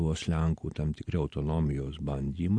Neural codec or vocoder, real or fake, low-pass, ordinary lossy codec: none; real; 9.9 kHz; MP3, 48 kbps